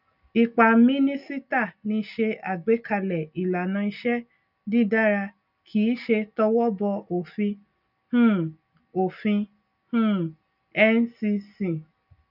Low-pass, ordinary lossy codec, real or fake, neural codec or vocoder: 5.4 kHz; none; real; none